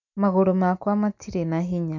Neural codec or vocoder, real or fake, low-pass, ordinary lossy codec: none; real; 7.2 kHz; none